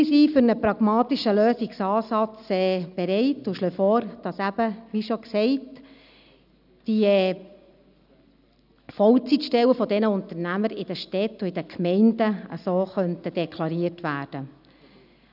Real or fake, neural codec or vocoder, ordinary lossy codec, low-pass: real; none; none; 5.4 kHz